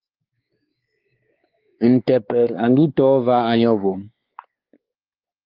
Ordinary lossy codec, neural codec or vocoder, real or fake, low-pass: Opus, 32 kbps; codec, 16 kHz, 4 kbps, X-Codec, WavLM features, trained on Multilingual LibriSpeech; fake; 5.4 kHz